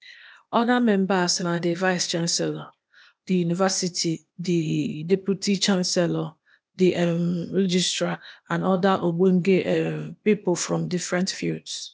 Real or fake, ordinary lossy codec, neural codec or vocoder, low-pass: fake; none; codec, 16 kHz, 0.8 kbps, ZipCodec; none